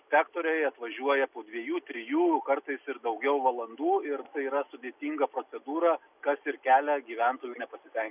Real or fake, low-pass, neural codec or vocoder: real; 3.6 kHz; none